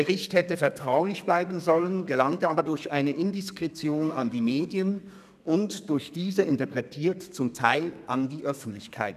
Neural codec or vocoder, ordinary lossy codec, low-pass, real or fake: codec, 32 kHz, 1.9 kbps, SNAC; none; 14.4 kHz; fake